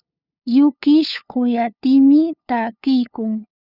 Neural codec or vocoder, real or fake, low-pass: codec, 16 kHz, 2 kbps, FunCodec, trained on LibriTTS, 25 frames a second; fake; 5.4 kHz